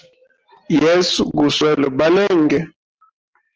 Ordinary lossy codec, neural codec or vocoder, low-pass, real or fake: Opus, 16 kbps; none; 7.2 kHz; real